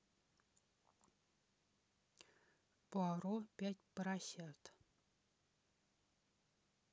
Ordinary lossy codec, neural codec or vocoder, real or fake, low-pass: none; none; real; none